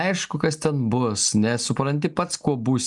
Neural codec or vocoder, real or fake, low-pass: none; real; 10.8 kHz